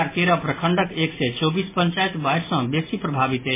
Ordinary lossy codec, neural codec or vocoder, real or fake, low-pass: MP3, 16 kbps; none; real; 3.6 kHz